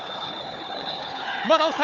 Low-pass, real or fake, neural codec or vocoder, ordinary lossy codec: 7.2 kHz; fake; codec, 16 kHz, 4 kbps, FunCodec, trained on Chinese and English, 50 frames a second; none